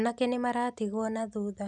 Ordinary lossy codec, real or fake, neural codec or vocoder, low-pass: none; real; none; 10.8 kHz